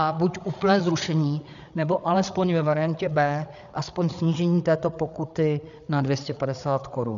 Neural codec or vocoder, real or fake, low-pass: codec, 16 kHz, 8 kbps, FreqCodec, larger model; fake; 7.2 kHz